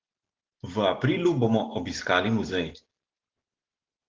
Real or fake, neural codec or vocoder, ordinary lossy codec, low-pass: real; none; Opus, 16 kbps; 7.2 kHz